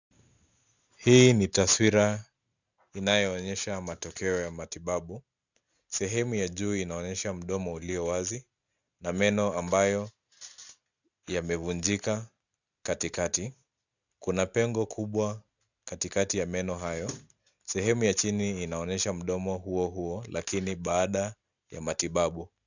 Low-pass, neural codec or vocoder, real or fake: 7.2 kHz; none; real